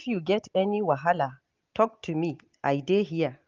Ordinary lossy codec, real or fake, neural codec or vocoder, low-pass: Opus, 32 kbps; real; none; 7.2 kHz